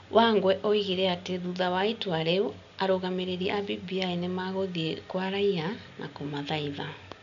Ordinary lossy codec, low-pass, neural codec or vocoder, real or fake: none; 7.2 kHz; none; real